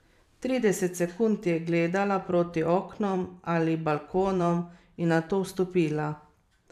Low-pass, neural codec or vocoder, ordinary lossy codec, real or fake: 14.4 kHz; vocoder, 44.1 kHz, 128 mel bands every 512 samples, BigVGAN v2; none; fake